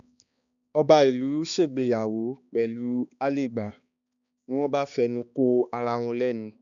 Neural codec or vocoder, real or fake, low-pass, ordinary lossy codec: codec, 16 kHz, 2 kbps, X-Codec, HuBERT features, trained on balanced general audio; fake; 7.2 kHz; none